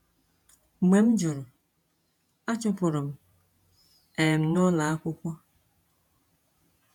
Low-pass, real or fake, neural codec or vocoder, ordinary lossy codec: 19.8 kHz; fake; vocoder, 48 kHz, 128 mel bands, Vocos; none